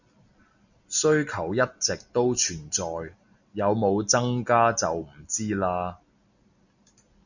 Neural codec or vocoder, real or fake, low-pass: none; real; 7.2 kHz